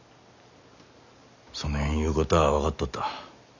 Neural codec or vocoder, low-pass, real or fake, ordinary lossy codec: none; 7.2 kHz; real; none